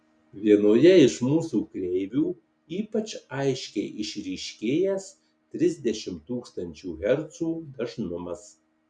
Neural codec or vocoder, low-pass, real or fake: none; 9.9 kHz; real